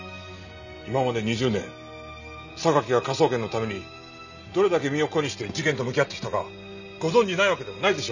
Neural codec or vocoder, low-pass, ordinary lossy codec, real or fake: none; 7.2 kHz; none; real